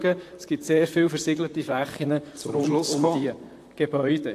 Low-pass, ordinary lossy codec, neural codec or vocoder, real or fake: 14.4 kHz; AAC, 64 kbps; vocoder, 44.1 kHz, 128 mel bands, Pupu-Vocoder; fake